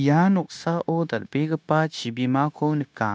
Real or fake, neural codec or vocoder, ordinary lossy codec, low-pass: fake; codec, 16 kHz, 0.9 kbps, LongCat-Audio-Codec; none; none